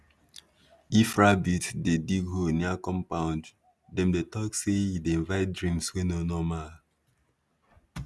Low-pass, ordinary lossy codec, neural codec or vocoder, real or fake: none; none; none; real